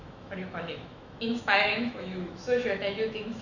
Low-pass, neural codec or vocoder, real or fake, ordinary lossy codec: 7.2 kHz; autoencoder, 48 kHz, 128 numbers a frame, DAC-VAE, trained on Japanese speech; fake; none